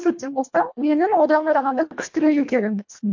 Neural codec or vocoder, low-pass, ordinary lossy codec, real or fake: codec, 24 kHz, 1.5 kbps, HILCodec; 7.2 kHz; MP3, 48 kbps; fake